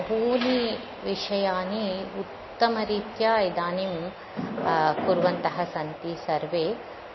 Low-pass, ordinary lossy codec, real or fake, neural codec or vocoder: 7.2 kHz; MP3, 24 kbps; real; none